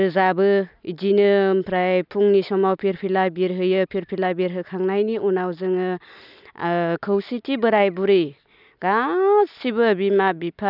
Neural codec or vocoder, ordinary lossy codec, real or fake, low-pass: none; none; real; 5.4 kHz